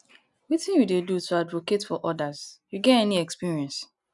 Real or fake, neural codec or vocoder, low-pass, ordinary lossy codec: real; none; 10.8 kHz; none